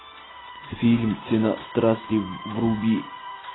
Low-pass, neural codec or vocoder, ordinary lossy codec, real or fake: 7.2 kHz; none; AAC, 16 kbps; real